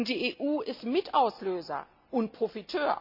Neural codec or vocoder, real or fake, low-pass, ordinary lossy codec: none; real; 5.4 kHz; none